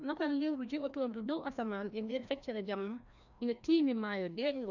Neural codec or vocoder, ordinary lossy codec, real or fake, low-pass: codec, 16 kHz, 1 kbps, FreqCodec, larger model; none; fake; 7.2 kHz